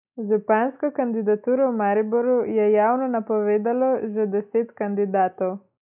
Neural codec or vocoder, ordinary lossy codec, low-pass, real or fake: none; none; 3.6 kHz; real